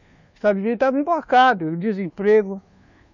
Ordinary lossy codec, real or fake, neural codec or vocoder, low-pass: none; fake; codec, 24 kHz, 1.2 kbps, DualCodec; 7.2 kHz